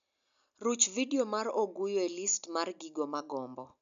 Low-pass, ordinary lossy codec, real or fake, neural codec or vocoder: 7.2 kHz; none; real; none